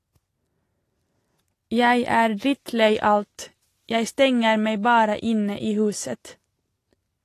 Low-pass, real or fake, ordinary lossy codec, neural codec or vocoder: 14.4 kHz; real; AAC, 48 kbps; none